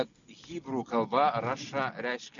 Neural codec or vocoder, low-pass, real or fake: none; 7.2 kHz; real